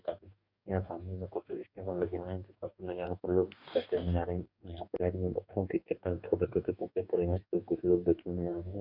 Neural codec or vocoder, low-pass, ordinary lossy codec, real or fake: codec, 44.1 kHz, 2.6 kbps, DAC; 5.4 kHz; none; fake